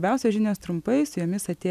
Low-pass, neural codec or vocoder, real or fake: 14.4 kHz; none; real